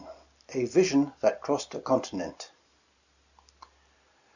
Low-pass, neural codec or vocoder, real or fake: 7.2 kHz; none; real